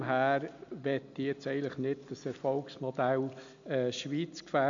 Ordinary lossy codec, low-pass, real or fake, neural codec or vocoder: MP3, 64 kbps; 7.2 kHz; real; none